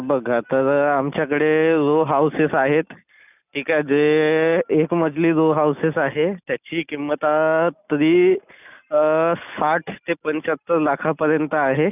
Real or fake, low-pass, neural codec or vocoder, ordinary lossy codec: real; 3.6 kHz; none; none